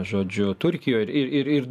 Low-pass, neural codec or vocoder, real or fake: 14.4 kHz; vocoder, 44.1 kHz, 128 mel bands every 512 samples, BigVGAN v2; fake